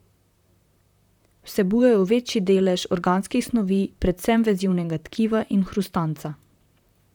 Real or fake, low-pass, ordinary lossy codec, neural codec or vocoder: fake; 19.8 kHz; none; vocoder, 44.1 kHz, 128 mel bands, Pupu-Vocoder